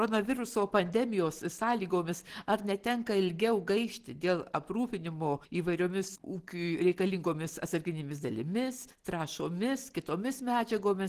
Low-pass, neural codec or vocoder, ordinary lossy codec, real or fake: 14.4 kHz; none; Opus, 16 kbps; real